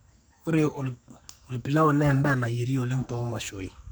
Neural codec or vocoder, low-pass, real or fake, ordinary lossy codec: codec, 44.1 kHz, 2.6 kbps, SNAC; none; fake; none